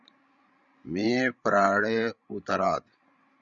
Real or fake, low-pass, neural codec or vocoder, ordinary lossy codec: fake; 7.2 kHz; codec, 16 kHz, 16 kbps, FreqCodec, larger model; Opus, 64 kbps